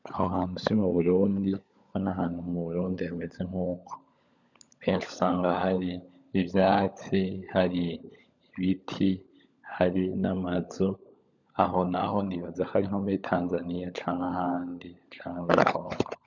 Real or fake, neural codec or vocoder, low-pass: fake; codec, 16 kHz, 16 kbps, FunCodec, trained on LibriTTS, 50 frames a second; 7.2 kHz